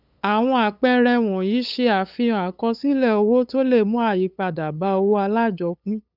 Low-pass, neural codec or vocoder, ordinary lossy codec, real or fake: 5.4 kHz; codec, 16 kHz, 8 kbps, FunCodec, trained on LibriTTS, 25 frames a second; none; fake